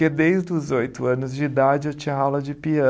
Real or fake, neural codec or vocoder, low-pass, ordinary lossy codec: real; none; none; none